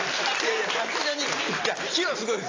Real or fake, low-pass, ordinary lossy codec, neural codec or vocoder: real; 7.2 kHz; AAC, 32 kbps; none